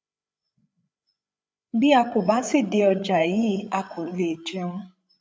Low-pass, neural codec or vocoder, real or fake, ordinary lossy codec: none; codec, 16 kHz, 8 kbps, FreqCodec, larger model; fake; none